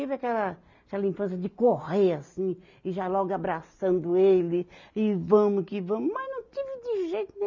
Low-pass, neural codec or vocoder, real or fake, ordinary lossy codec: 7.2 kHz; none; real; none